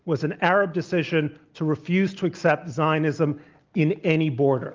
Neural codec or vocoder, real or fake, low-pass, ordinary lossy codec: none; real; 7.2 kHz; Opus, 32 kbps